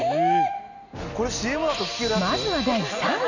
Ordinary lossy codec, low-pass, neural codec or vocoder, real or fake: none; 7.2 kHz; none; real